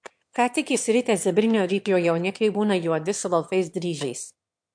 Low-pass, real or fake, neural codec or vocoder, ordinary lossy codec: 9.9 kHz; fake; autoencoder, 22.05 kHz, a latent of 192 numbers a frame, VITS, trained on one speaker; MP3, 64 kbps